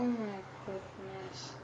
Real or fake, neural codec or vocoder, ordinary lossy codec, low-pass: fake; codec, 44.1 kHz, 7.8 kbps, DAC; MP3, 64 kbps; 9.9 kHz